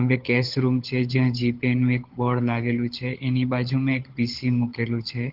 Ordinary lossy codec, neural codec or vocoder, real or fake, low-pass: Opus, 16 kbps; codec, 16 kHz, 16 kbps, FunCodec, trained on LibriTTS, 50 frames a second; fake; 5.4 kHz